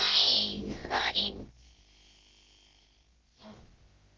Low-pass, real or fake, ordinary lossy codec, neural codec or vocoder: 7.2 kHz; fake; Opus, 16 kbps; codec, 16 kHz, about 1 kbps, DyCAST, with the encoder's durations